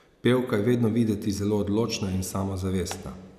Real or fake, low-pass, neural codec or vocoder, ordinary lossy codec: real; 14.4 kHz; none; none